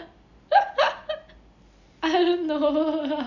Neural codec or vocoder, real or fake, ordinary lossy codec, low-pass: none; real; none; 7.2 kHz